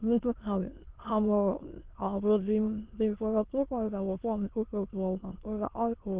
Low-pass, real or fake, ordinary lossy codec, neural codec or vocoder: 3.6 kHz; fake; Opus, 16 kbps; autoencoder, 22.05 kHz, a latent of 192 numbers a frame, VITS, trained on many speakers